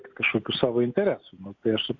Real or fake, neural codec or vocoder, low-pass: real; none; 7.2 kHz